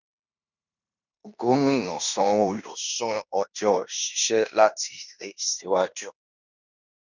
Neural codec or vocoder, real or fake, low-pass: codec, 16 kHz in and 24 kHz out, 0.9 kbps, LongCat-Audio-Codec, fine tuned four codebook decoder; fake; 7.2 kHz